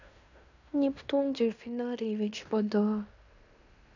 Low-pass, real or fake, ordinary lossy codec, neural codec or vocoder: 7.2 kHz; fake; none; codec, 16 kHz in and 24 kHz out, 0.9 kbps, LongCat-Audio-Codec, fine tuned four codebook decoder